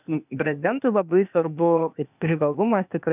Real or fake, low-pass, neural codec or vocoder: fake; 3.6 kHz; codec, 16 kHz, 0.8 kbps, ZipCodec